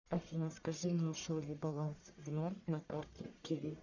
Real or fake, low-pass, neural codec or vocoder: fake; 7.2 kHz; codec, 44.1 kHz, 1.7 kbps, Pupu-Codec